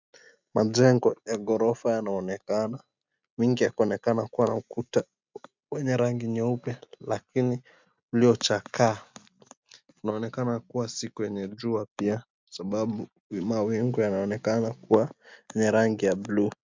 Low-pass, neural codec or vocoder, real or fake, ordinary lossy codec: 7.2 kHz; none; real; MP3, 64 kbps